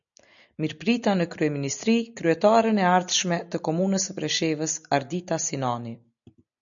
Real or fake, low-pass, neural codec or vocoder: real; 7.2 kHz; none